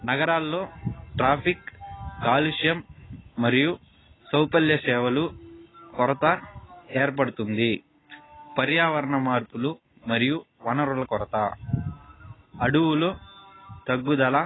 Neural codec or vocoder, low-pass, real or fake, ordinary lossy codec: none; 7.2 kHz; real; AAC, 16 kbps